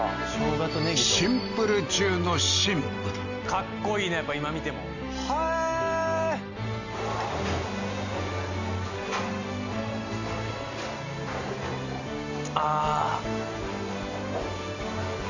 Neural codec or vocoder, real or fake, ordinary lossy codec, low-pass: none; real; none; 7.2 kHz